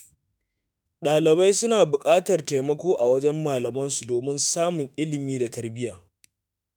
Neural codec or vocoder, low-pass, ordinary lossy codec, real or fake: autoencoder, 48 kHz, 32 numbers a frame, DAC-VAE, trained on Japanese speech; none; none; fake